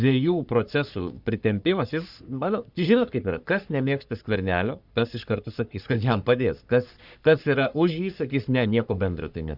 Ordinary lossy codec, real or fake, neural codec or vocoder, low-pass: Opus, 64 kbps; fake; codec, 44.1 kHz, 3.4 kbps, Pupu-Codec; 5.4 kHz